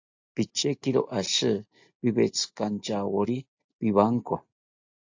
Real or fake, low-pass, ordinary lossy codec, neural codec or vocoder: real; 7.2 kHz; AAC, 48 kbps; none